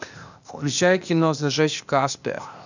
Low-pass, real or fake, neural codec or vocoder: 7.2 kHz; fake; codec, 16 kHz, 0.8 kbps, ZipCodec